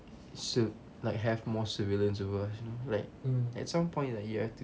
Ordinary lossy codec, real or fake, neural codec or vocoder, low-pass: none; real; none; none